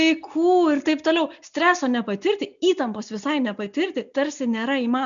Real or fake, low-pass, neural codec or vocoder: real; 7.2 kHz; none